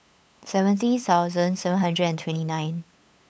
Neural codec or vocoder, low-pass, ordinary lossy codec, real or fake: codec, 16 kHz, 8 kbps, FunCodec, trained on LibriTTS, 25 frames a second; none; none; fake